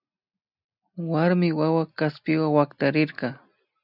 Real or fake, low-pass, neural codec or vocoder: real; 5.4 kHz; none